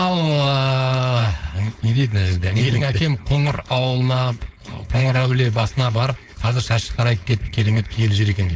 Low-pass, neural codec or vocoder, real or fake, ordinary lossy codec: none; codec, 16 kHz, 4.8 kbps, FACodec; fake; none